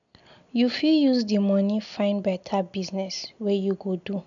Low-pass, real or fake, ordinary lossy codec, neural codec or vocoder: 7.2 kHz; real; MP3, 96 kbps; none